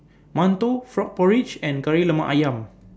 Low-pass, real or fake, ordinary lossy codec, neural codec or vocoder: none; real; none; none